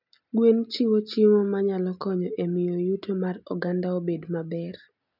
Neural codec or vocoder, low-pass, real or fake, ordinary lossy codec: none; 5.4 kHz; real; none